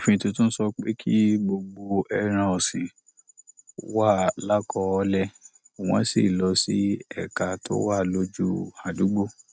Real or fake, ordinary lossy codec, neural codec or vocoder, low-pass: real; none; none; none